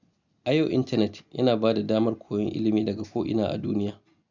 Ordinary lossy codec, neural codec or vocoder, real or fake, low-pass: none; none; real; 7.2 kHz